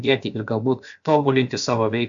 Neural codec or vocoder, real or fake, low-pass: codec, 16 kHz, about 1 kbps, DyCAST, with the encoder's durations; fake; 7.2 kHz